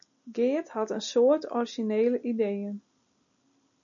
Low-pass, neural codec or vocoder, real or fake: 7.2 kHz; none; real